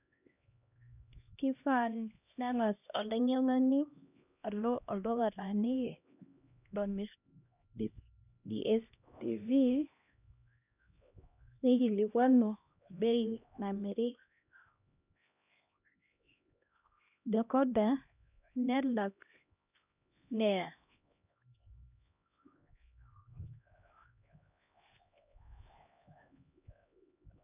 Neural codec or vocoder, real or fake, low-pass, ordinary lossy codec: codec, 16 kHz, 1 kbps, X-Codec, HuBERT features, trained on LibriSpeech; fake; 3.6 kHz; none